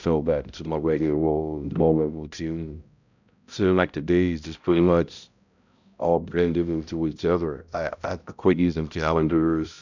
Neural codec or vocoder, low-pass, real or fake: codec, 16 kHz, 0.5 kbps, X-Codec, HuBERT features, trained on balanced general audio; 7.2 kHz; fake